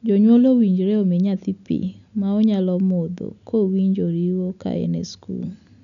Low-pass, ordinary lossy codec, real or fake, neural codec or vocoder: 7.2 kHz; none; real; none